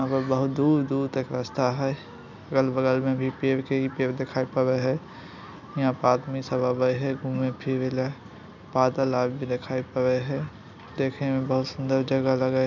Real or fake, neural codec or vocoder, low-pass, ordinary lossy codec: real; none; 7.2 kHz; none